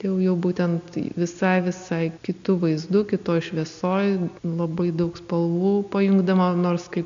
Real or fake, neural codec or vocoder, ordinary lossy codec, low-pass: real; none; MP3, 64 kbps; 7.2 kHz